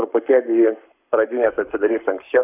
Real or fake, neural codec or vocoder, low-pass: fake; codec, 44.1 kHz, 7.8 kbps, Pupu-Codec; 3.6 kHz